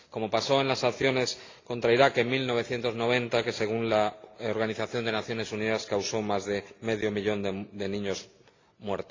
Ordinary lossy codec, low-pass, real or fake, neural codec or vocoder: AAC, 32 kbps; 7.2 kHz; real; none